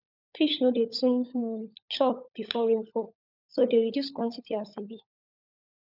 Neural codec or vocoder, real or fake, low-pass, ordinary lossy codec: codec, 16 kHz, 16 kbps, FunCodec, trained on LibriTTS, 50 frames a second; fake; 5.4 kHz; none